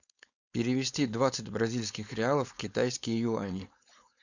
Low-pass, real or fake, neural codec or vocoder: 7.2 kHz; fake; codec, 16 kHz, 4.8 kbps, FACodec